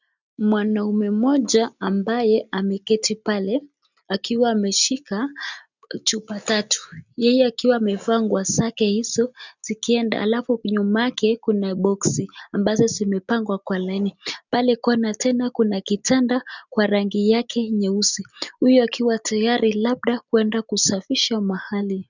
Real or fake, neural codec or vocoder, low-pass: real; none; 7.2 kHz